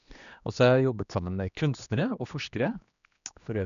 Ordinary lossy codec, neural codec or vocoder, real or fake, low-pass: none; codec, 16 kHz, 2 kbps, X-Codec, HuBERT features, trained on general audio; fake; 7.2 kHz